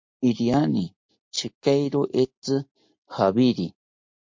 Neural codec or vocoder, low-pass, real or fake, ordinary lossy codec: none; 7.2 kHz; real; MP3, 48 kbps